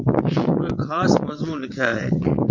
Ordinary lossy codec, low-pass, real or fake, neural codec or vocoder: MP3, 48 kbps; 7.2 kHz; fake; vocoder, 22.05 kHz, 80 mel bands, WaveNeXt